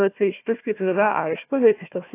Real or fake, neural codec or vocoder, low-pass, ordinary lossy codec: fake; codec, 16 kHz, 1 kbps, FunCodec, trained on Chinese and English, 50 frames a second; 3.6 kHz; AAC, 24 kbps